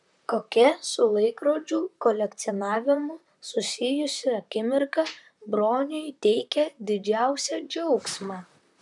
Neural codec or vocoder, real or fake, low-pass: vocoder, 44.1 kHz, 128 mel bands, Pupu-Vocoder; fake; 10.8 kHz